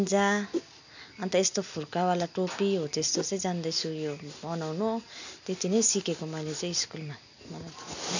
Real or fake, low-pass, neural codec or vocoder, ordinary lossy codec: real; 7.2 kHz; none; none